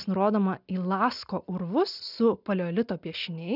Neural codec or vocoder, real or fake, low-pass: none; real; 5.4 kHz